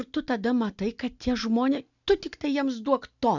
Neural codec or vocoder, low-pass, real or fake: none; 7.2 kHz; real